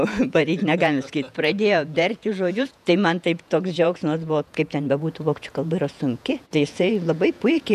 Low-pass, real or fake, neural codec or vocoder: 14.4 kHz; fake; vocoder, 48 kHz, 128 mel bands, Vocos